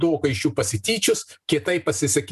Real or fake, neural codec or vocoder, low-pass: real; none; 14.4 kHz